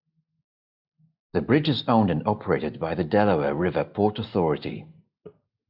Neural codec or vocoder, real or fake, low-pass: none; real; 5.4 kHz